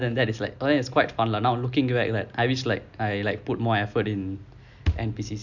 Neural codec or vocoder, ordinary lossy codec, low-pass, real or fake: none; none; 7.2 kHz; real